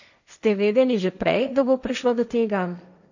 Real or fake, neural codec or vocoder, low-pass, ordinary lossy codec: fake; codec, 16 kHz, 1.1 kbps, Voila-Tokenizer; 7.2 kHz; none